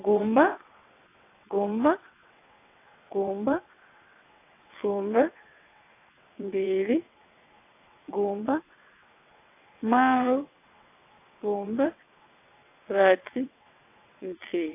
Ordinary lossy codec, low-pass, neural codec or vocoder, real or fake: AAC, 24 kbps; 3.6 kHz; vocoder, 22.05 kHz, 80 mel bands, WaveNeXt; fake